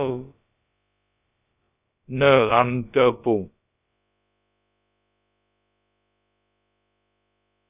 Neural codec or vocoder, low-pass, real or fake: codec, 16 kHz, about 1 kbps, DyCAST, with the encoder's durations; 3.6 kHz; fake